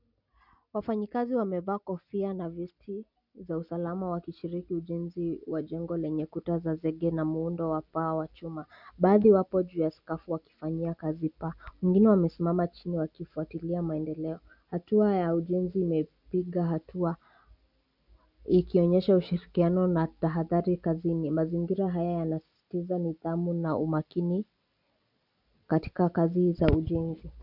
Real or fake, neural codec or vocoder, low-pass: real; none; 5.4 kHz